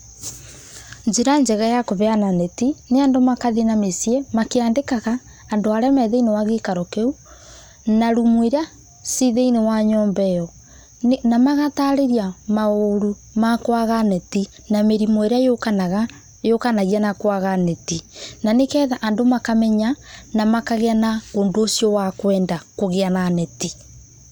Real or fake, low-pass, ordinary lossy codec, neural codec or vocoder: real; 19.8 kHz; none; none